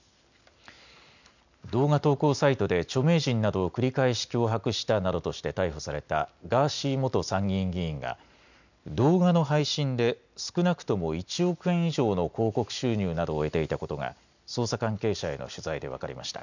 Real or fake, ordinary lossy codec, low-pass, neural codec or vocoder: real; none; 7.2 kHz; none